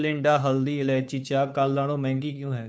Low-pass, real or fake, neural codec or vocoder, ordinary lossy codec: none; fake; codec, 16 kHz, 2 kbps, FunCodec, trained on Chinese and English, 25 frames a second; none